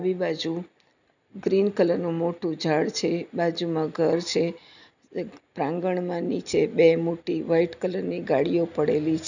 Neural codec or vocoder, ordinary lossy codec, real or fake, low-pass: none; none; real; 7.2 kHz